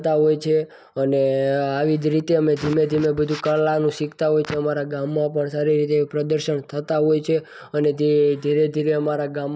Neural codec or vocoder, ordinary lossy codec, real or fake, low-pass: none; none; real; none